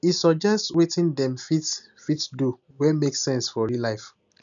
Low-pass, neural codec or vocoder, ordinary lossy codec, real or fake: 7.2 kHz; none; MP3, 96 kbps; real